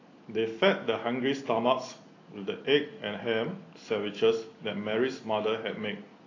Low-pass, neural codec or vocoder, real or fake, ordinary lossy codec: 7.2 kHz; none; real; AAC, 32 kbps